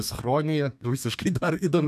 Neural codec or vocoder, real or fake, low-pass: codec, 32 kHz, 1.9 kbps, SNAC; fake; 14.4 kHz